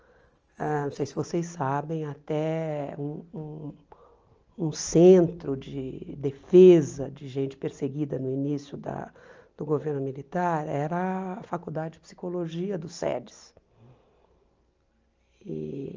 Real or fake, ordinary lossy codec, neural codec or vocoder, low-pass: real; Opus, 24 kbps; none; 7.2 kHz